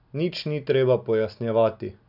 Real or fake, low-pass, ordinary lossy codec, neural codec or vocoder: real; 5.4 kHz; none; none